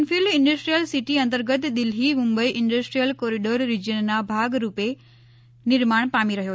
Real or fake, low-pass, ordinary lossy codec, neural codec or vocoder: real; none; none; none